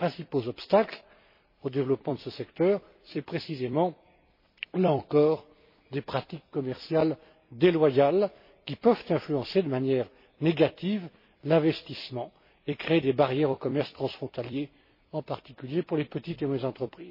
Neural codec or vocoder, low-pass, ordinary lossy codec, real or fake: vocoder, 44.1 kHz, 128 mel bands every 256 samples, BigVGAN v2; 5.4 kHz; MP3, 24 kbps; fake